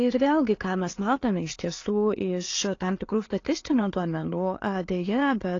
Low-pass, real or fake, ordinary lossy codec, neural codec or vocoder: 7.2 kHz; real; AAC, 32 kbps; none